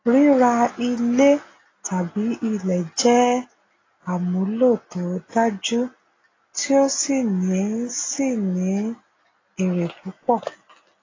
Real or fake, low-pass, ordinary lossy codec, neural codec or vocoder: real; 7.2 kHz; AAC, 32 kbps; none